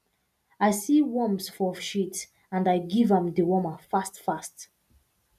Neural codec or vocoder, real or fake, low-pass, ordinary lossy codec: none; real; 14.4 kHz; none